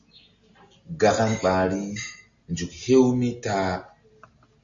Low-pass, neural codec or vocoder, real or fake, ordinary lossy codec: 7.2 kHz; none; real; Opus, 64 kbps